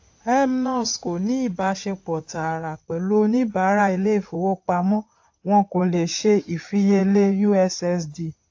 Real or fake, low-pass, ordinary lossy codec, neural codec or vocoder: fake; 7.2 kHz; AAC, 48 kbps; codec, 16 kHz in and 24 kHz out, 2.2 kbps, FireRedTTS-2 codec